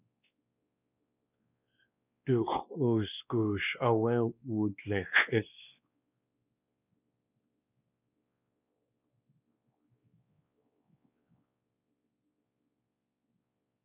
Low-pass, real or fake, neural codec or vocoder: 3.6 kHz; fake; codec, 16 kHz, 2 kbps, X-Codec, WavLM features, trained on Multilingual LibriSpeech